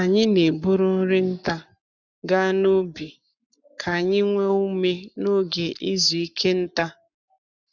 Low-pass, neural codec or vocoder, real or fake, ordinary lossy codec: 7.2 kHz; codec, 44.1 kHz, 7.8 kbps, Pupu-Codec; fake; none